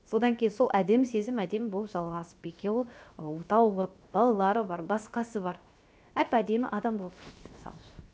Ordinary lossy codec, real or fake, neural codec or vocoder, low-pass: none; fake; codec, 16 kHz, 0.7 kbps, FocalCodec; none